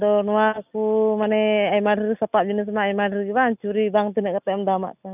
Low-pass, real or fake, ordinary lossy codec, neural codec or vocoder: 3.6 kHz; real; none; none